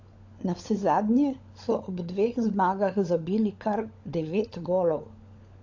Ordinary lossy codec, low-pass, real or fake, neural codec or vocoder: AAC, 48 kbps; 7.2 kHz; fake; codec, 16 kHz, 16 kbps, FunCodec, trained on LibriTTS, 50 frames a second